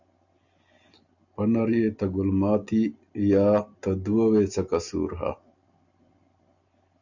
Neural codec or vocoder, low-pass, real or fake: none; 7.2 kHz; real